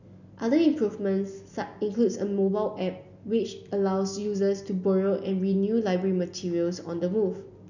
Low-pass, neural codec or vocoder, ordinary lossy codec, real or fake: 7.2 kHz; none; none; real